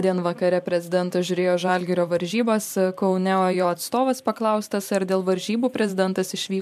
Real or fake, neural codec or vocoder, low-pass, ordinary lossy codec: fake; vocoder, 44.1 kHz, 128 mel bands every 512 samples, BigVGAN v2; 14.4 kHz; MP3, 96 kbps